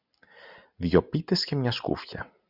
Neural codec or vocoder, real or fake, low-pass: none; real; 5.4 kHz